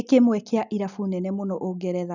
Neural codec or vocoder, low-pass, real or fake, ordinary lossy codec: none; 7.2 kHz; real; none